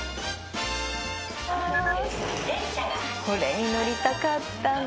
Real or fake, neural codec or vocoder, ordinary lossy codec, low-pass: real; none; none; none